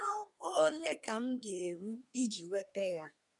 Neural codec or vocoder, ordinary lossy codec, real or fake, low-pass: codec, 24 kHz, 1 kbps, SNAC; none; fake; 10.8 kHz